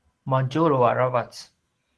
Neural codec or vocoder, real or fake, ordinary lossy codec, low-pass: none; real; Opus, 16 kbps; 10.8 kHz